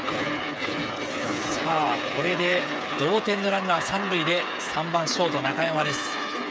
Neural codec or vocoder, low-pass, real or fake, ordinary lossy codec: codec, 16 kHz, 8 kbps, FreqCodec, smaller model; none; fake; none